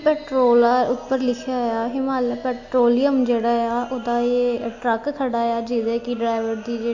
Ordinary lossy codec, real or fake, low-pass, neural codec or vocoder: AAC, 48 kbps; real; 7.2 kHz; none